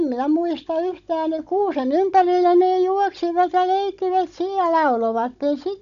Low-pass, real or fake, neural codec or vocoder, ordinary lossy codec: 7.2 kHz; fake; codec, 16 kHz, 16 kbps, FunCodec, trained on Chinese and English, 50 frames a second; none